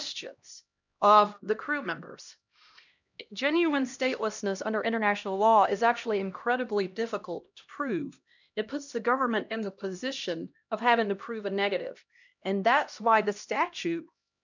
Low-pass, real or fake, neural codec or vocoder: 7.2 kHz; fake; codec, 16 kHz, 1 kbps, X-Codec, HuBERT features, trained on LibriSpeech